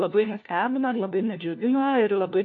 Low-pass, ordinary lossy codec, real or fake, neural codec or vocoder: 7.2 kHz; AAC, 48 kbps; fake; codec, 16 kHz, 1 kbps, FunCodec, trained on LibriTTS, 50 frames a second